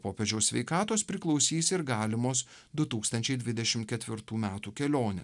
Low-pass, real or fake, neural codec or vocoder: 10.8 kHz; real; none